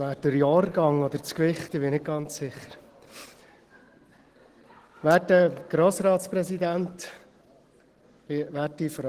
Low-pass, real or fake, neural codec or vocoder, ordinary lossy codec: 14.4 kHz; real; none; Opus, 16 kbps